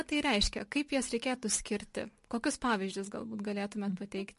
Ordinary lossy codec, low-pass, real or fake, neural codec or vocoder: MP3, 48 kbps; 14.4 kHz; real; none